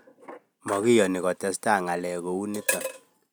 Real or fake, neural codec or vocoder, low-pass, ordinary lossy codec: real; none; none; none